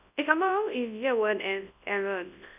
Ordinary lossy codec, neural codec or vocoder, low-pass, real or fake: none; codec, 24 kHz, 0.9 kbps, WavTokenizer, large speech release; 3.6 kHz; fake